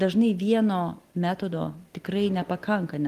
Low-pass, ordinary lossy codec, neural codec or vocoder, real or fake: 14.4 kHz; Opus, 24 kbps; none; real